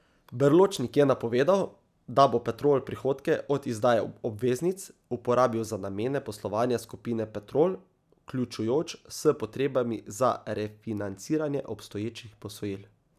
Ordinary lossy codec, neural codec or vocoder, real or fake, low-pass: none; none; real; 14.4 kHz